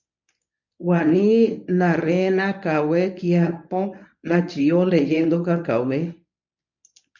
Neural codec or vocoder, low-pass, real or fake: codec, 24 kHz, 0.9 kbps, WavTokenizer, medium speech release version 1; 7.2 kHz; fake